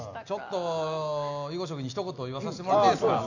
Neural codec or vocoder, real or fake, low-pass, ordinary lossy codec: none; real; 7.2 kHz; none